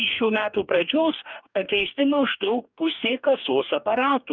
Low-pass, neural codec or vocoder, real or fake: 7.2 kHz; codec, 44.1 kHz, 2.6 kbps, DAC; fake